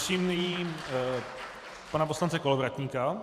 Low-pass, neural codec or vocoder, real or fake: 14.4 kHz; vocoder, 44.1 kHz, 128 mel bands every 512 samples, BigVGAN v2; fake